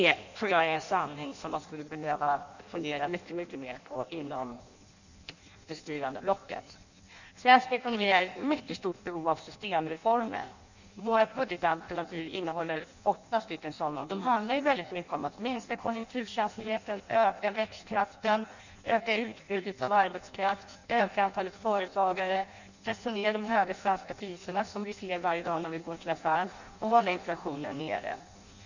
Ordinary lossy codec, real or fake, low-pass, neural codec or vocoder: none; fake; 7.2 kHz; codec, 16 kHz in and 24 kHz out, 0.6 kbps, FireRedTTS-2 codec